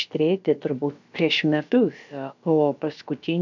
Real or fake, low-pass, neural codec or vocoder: fake; 7.2 kHz; codec, 16 kHz, about 1 kbps, DyCAST, with the encoder's durations